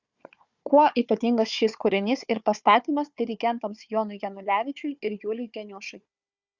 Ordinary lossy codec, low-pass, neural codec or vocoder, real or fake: Opus, 64 kbps; 7.2 kHz; codec, 16 kHz, 4 kbps, FunCodec, trained on Chinese and English, 50 frames a second; fake